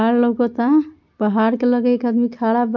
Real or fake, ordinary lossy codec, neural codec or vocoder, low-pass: real; none; none; 7.2 kHz